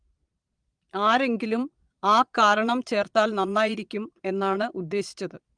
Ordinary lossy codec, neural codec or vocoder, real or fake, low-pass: Opus, 32 kbps; vocoder, 22.05 kHz, 80 mel bands, Vocos; fake; 9.9 kHz